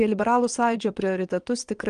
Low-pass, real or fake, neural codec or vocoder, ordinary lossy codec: 9.9 kHz; fake; vocoder, 22.05 kHz, 80 mel bands, WaveNeXt; Opus, 24 kbps